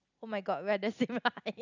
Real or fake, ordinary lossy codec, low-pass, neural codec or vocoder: real; none; 7.2 kHz; none